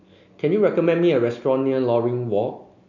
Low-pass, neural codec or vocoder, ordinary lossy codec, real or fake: 7.2 kHz; none; none; real